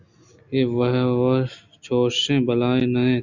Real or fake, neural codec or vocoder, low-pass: real; none; 7.2 kHz